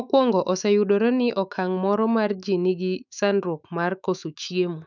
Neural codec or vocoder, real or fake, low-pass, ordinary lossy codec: autoencoder, 48 kHz, 128 numbers a frame, DAC-VAE, trained on Japanese speech; fake; 7.2 kHz; none